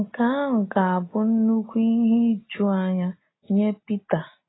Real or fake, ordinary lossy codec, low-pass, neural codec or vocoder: real; AAC, 16 kbps; 7.2 kHz; none